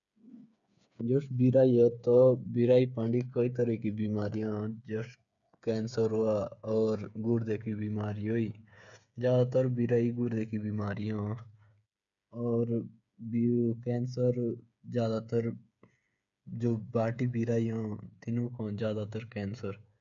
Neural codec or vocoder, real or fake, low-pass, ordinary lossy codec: codec, 16 kHz, 8 kbps, FreqCodec, smaller model; fake; 7.2 kHz; none